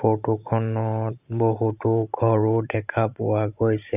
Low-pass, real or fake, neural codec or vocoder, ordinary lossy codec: 3.6 kHz; fake; vocoder, 44.1 kHz, 128 mel bands every 512 samples, BigVGAN v2; none